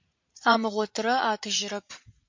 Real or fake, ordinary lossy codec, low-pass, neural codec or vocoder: fake; MP3, 48 kbps; 7.2 kHz; vocoder, 44.1 kHz, 80 mel bands, Vocos